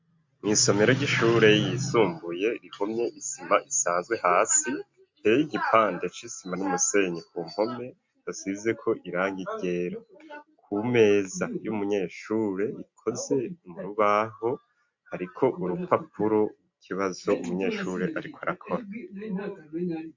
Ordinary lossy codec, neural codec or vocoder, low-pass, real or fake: MP3, 48 kbps; none; 7.2 kHz; real